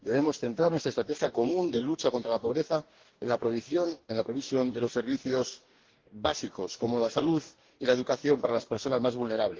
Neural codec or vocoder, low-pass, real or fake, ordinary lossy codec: codec, 44.1 kHz, 2.6 kbps, DAC; 7.2 kHz; fake; Opus, 16 kbps